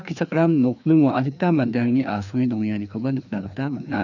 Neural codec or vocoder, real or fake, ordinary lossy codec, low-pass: codec, 16 kHz, 2 kbps, FreqCodec, larger model; fake; none; 7.2 kHz